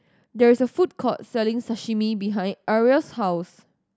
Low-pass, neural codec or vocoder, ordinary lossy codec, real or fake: none; none; none; real